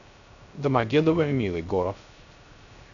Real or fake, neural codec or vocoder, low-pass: fake; codec, 16 kHz, 0.3 kbps, FocalCodec; 7.2 kHz